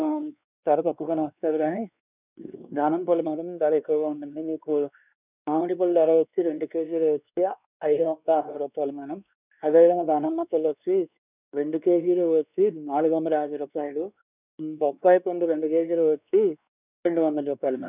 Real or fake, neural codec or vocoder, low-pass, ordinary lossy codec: fake; codec, 16 kHz, 2 kbps, X-Codec, WavLM features, trained on Multilingual LibriSpeech; 3.6 kHz; none